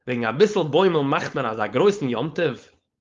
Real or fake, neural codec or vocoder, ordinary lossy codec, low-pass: fake; codec, 16 kHz, 4.8 kbps, FACodec; Opus, 32 kbps; 7.2 kHz